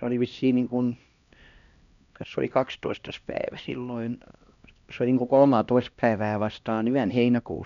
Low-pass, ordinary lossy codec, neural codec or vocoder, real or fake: 7.2 kHz; none; codec, 16 kHz, 1 kbps, X-Codec, HuBERT features, trained on LibriSpeech; fake